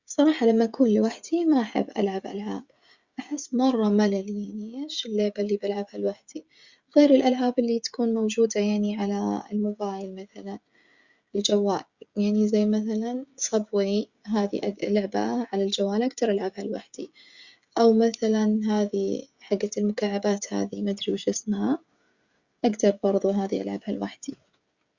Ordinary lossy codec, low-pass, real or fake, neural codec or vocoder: Opus, 64 kbps; 7.2 kHz; fake; codec, 16 kHz, 16 kbps, FreqCodec, smaller model